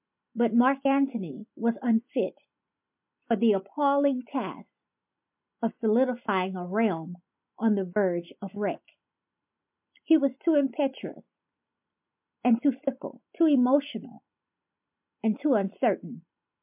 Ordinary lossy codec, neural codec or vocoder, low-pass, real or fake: MP3, 32 kbps; none; 3.6 kHz; real